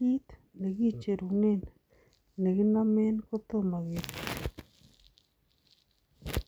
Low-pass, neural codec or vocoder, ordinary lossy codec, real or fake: none; none; none; real